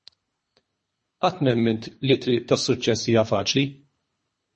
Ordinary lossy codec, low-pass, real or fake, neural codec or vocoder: MP3, 32 kbps; 10.8 kHz; fake; codec, 24 kHz, 3 kbps, HILCodec